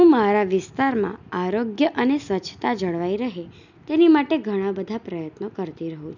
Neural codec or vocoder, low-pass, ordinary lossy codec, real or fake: none; 7.2 kHz; none; real